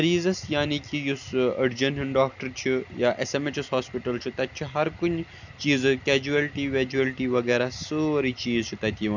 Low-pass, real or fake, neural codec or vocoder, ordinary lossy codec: 7.2 kHz; real; none; none